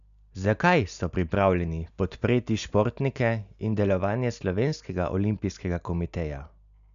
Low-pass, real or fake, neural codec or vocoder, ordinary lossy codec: 7.2 kHz; real; none; none